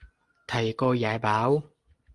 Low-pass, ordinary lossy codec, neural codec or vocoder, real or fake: 10.8 kHz; Opus, 24 kbps; none; real